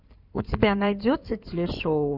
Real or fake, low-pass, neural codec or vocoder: fake; 5.4 kHz; codec, 16 kHz in and 24 kHz out, 2.2 kbps, FireRedTTS-2 codec